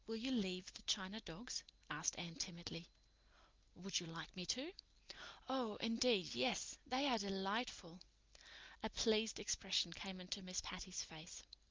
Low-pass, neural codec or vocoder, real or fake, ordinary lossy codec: 7.2 kHz; none; real; Opus, 32 kbps